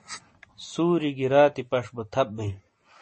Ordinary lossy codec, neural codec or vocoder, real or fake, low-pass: MP3, 32 kbps; none; real; 9.9 kHz